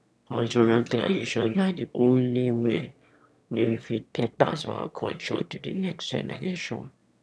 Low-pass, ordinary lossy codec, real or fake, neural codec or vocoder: none; none; fake; autoencoder, 22.05 kHz, a latent of 192 numbers a frame, VITS, trained on one speaker